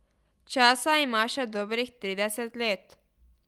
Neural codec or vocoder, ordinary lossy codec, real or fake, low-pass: none; Opus, 32 kbps; real; 19.8 kHz